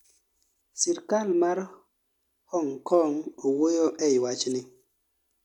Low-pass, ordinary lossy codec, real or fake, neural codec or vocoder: 19.8 kHz; none; real; none